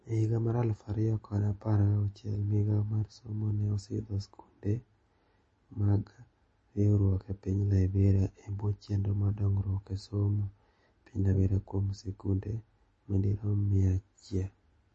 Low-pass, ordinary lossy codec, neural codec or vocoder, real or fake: 10.8 kHz; MP3, 32 kbps; none; real